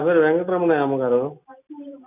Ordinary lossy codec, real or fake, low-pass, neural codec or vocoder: none; real; 3.6 kHz; none